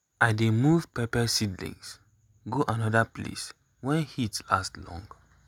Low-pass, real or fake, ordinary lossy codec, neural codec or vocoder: 19.8 kHz; real; none; none